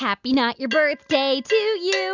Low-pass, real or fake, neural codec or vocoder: 7.2 kHz; real; none